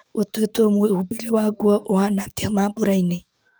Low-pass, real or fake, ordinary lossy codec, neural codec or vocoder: none; fake; none; codec, 44.1 kHz, 7.8 kbps, DAC